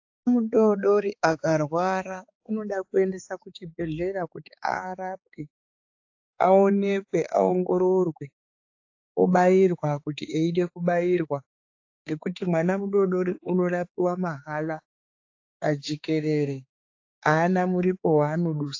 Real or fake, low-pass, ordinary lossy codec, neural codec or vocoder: fake; 7.2 kHz; AAC, 48 kbps; codec, 16 kHz, 4 kbps, X-Codec, HuBERT features, trained on balanced general audio